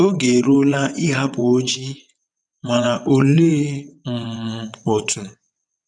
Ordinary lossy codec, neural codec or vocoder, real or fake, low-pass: none; vocoder, 22.05 kHz, 80 mel bands, WaveNeXt; fake; 9.9 kHz